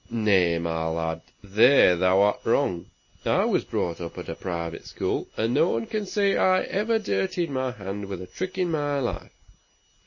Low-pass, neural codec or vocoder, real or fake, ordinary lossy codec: 7.2 kHz; none; real; MP3, 32 kbps